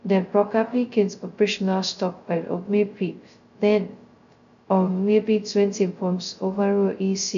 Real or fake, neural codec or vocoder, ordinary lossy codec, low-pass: fake; codec, 16 kHz, 0.2 kbps, FocalCodec; none; 7.2 kHz